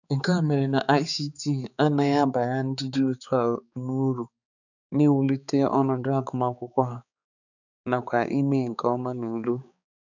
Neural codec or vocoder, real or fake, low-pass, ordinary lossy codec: codec, 16 kHz, 4 kbps, X-Codec, HuBERT features, trained on balanced general audio; fake; 7.2 kHz; none